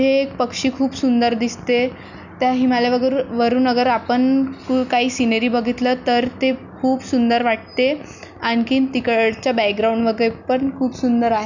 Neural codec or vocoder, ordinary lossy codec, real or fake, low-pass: none; none; real; 7.2 kHz